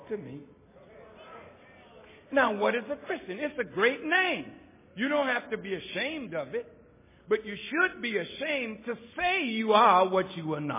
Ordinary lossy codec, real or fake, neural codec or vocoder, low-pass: MP3, 16 kbps; real; none; 3.6 kHz